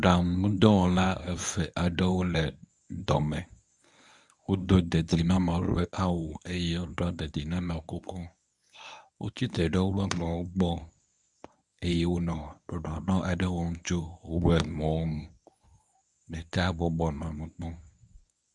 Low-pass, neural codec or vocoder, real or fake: 10.8 kHz; codec, 24 kHz, 0.9 kbps, WavTokenizer, medium speech release version 1; fake